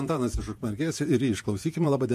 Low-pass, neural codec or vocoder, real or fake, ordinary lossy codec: 14.4 kHz; vocoder, 48 kHz, 128 mel bands, Vocos; fake; MP3, 64 kbps